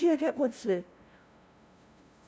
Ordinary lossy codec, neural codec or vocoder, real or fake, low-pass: none; codec, 16 kHz, 0.5 kbps, FunCodec, trained on LibriTTS, 25 frames a second; fake; none